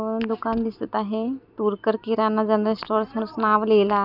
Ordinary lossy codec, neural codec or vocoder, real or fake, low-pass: none; none; real; 5.4 kHz